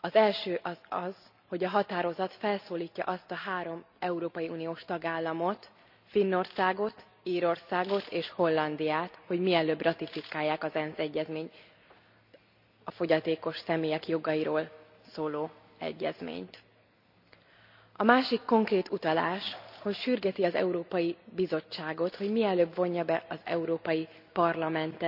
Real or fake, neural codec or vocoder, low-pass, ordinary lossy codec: real; none; 5.4 kHz; none